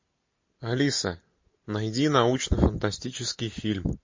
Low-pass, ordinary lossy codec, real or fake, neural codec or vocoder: 7.2 kHz; MP3, 32 kbps; real; none